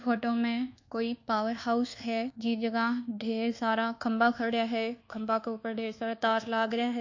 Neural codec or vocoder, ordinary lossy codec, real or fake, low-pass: codec, 24 kHz, 1.2 kbps, DualCodec; AAC, 48 kbps; fake; 7.2 kHz